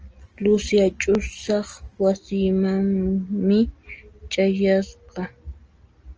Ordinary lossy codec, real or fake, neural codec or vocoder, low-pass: Opus, 24 kbps; real; none; 7.2 kHz